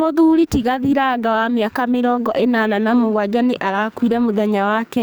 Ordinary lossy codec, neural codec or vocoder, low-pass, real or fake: none; codec, 44.1 kHz, 2.6 kbps, SNAC; none; fake